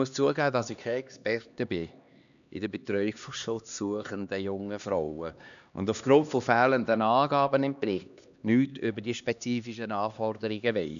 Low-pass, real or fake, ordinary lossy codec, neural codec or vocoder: 7.2 kHz; fake; none; codec, 16 kHz, 2 kbps, X-Codec, HuBERT features, trained on LibriSpeech